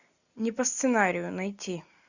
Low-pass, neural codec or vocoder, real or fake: 7.2 kHz; none; real